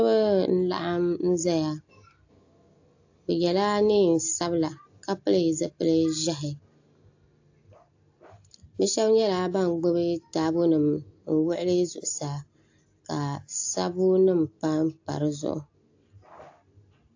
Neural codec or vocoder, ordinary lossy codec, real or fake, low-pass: none; AAC, 48 kbps; real; 7.2 kHz